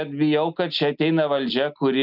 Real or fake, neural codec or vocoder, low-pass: real; none; 5.4 kHz